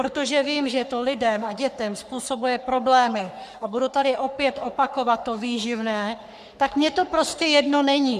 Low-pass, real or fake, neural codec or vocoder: 14.4 kHz; fake; codec, 44.1 kHz, 3.4 kbps, Pupu-Codec